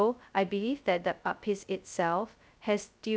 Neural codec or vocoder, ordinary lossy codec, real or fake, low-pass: codec, 16 kHz, 0.2 kbps, FocalCodec; none; fake; none